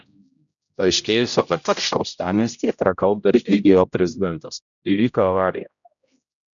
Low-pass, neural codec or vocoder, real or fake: 7.2 kHz; codec, 16 kHz, 0.5 kbps, X-Codec, HuBERT features, trained on general audio; fake